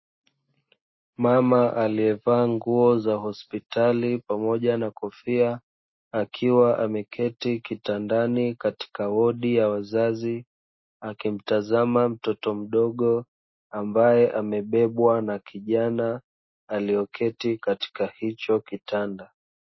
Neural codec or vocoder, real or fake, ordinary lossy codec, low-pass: none; real; MP3, 24 kbps; 7.2 kHz